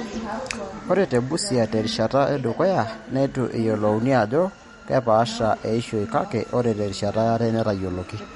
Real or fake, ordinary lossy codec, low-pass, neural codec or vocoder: real; MP3, 48 kbps; 19.8 kHz; none